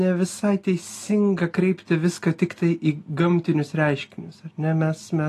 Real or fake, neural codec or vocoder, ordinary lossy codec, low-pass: real; none; AAC, 48 kbps; 14.4 kHz